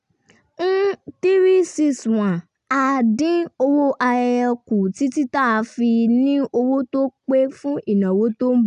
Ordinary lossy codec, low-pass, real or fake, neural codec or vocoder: none; 10.8 kHz; real; none